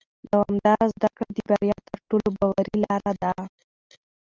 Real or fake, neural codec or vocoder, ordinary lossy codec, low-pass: real; none; Opus, 32 kbps; 7.2 kHz